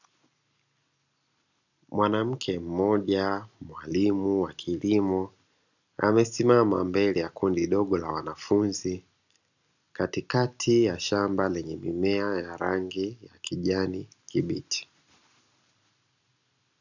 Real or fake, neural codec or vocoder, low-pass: real; none; 7.2 kHz